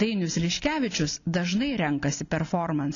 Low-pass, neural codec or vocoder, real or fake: 7.2 kHz; none; real